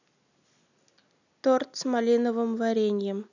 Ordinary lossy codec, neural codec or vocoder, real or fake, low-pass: none; none; real; 7.2 kHz